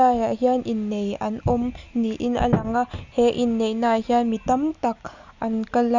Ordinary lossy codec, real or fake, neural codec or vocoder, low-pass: Opus, 64 kbps; real; none; 7.2 kHz